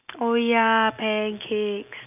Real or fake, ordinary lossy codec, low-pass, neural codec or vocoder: real; none; 3.6 kHz; none